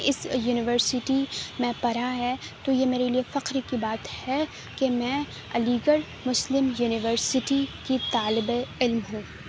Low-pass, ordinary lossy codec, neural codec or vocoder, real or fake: none; none; none; real